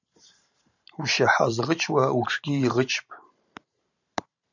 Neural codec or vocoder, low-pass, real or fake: vocoder, 44.1 kHz, 128 mel bands every 512 samples, BigVGAN v2; 7.2 kHz; fake